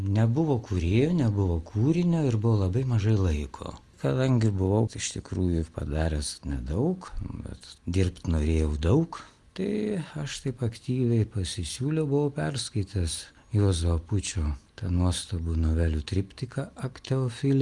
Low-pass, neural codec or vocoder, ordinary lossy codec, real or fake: 10.8 kHz; none; Opus, 32 kbps; real